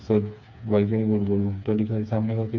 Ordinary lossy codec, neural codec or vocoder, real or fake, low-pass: MP3, 64 kbps; codec, 16 kHz, 4 kbps, FreqCodec, smaller model; fake; 7.2 kHz